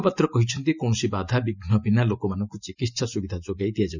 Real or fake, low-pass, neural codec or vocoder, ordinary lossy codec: real; 7.2 kHz; none; none